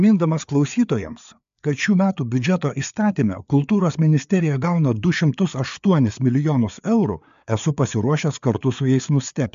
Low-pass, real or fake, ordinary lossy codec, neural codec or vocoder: 7.2 kHz; fake; MP3, 64 kbps; codec, 16 kHz, 4 kbps, FreqCodec, larger model